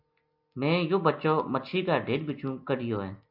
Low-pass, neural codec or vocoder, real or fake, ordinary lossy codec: 5.4 kHz; none; real; MP3, 48 kbps